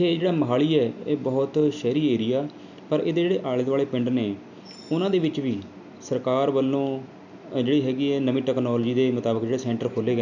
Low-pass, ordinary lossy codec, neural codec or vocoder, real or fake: 7.2 kHz; none; none; real